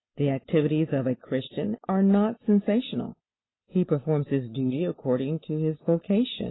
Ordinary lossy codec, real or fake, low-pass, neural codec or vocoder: AAC, 16 kbps; real; 7.2 kHz; none